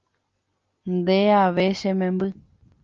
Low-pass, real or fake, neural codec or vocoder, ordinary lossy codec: 7.2 kHz; real; none; Opus, 32 kbps